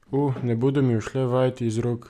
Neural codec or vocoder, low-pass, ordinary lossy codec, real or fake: none; 14.4 kHz; none; real